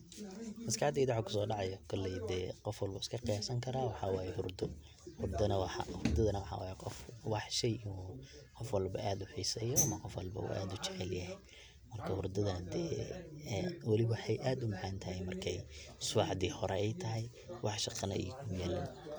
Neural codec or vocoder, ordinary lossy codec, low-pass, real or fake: none; none; none; real